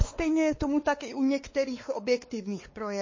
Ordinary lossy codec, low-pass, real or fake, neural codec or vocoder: MP3, 32 kbps; 7.2 kHz; fake; codec, 16 kHz, 2 kbps, X-Codec, WavLM features, trained on Multilingual LibriSpeech